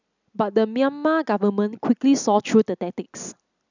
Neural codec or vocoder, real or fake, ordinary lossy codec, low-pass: none; real; none; 7.2 kHz